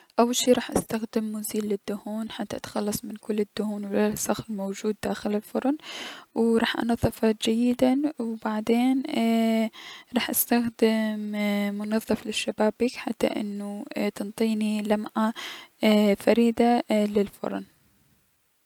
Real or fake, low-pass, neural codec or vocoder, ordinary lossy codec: real; 19.8 kHz; none; none